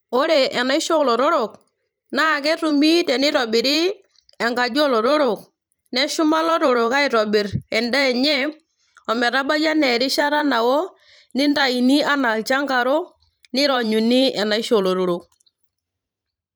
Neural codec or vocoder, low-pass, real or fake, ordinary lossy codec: vocoder, 44.1 kHz, 128 mel bands every 512 samples, BigVGAN v2; none; fake; none